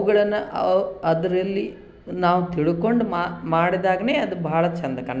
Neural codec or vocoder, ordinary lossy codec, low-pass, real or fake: none; none; none; real